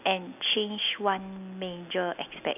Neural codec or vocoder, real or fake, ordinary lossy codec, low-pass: none; real; none; 3.6 kHz